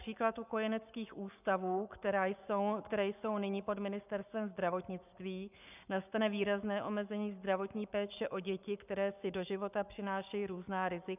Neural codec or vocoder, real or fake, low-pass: codec, 44.1 kHz, 7.8 kbps, Pupu-Codec; fake; 3.6 kHz